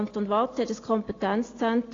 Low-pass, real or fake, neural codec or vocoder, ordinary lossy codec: 7.2 kHz; real; none; AAC, 32 kbps